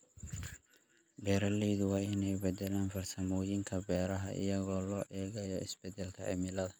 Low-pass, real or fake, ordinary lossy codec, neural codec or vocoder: none; real; none; none